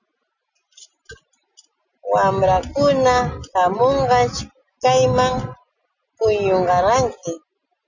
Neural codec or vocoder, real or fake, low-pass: none; real; 7.2 kHz